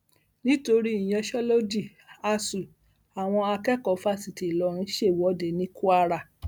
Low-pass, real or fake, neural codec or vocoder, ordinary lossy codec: none; real; none; none